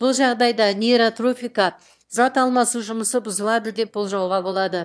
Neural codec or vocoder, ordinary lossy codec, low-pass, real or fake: autoencoder, 22.05 kHz, a latent of 192 numbers a frame, VITS, trained on one speaker; none; none; fake